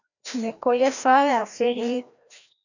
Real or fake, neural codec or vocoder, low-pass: fake; codec, 16 kHz, 1 kbps, FreqCodec, larger model; 7.2 kHz